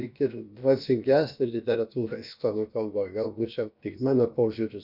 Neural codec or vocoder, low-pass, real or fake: codec, 16 kHz, about 1 kbps, DyCAST, with the encoder's durations; 5.4 kHz; fake